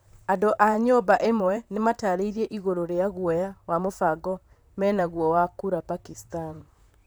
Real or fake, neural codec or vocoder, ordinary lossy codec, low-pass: fake; vocoder, 44.1 kHz, 128 mel bands, Pupu-Vocoder; none; none